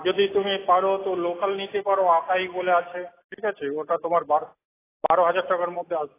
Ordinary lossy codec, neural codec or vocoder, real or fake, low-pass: AAC, 16 kbps; none; real; 3.6 kHz